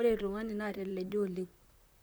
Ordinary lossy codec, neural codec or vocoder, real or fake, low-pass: none; vocoder, 44.1 kHz, 128 mel bands, Pupu-Vocoder; fake; none